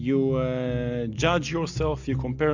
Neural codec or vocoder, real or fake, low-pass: none; real; 7.2 kHz